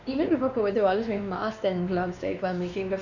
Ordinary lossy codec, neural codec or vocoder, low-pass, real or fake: none; codec, 16 kHz, 2 kbps, X-Codec, WavLM features, trained on Multilingual LibriSpeech; 7.2 kHz; fake